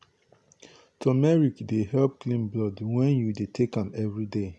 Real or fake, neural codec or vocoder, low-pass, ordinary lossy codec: real; none; none; none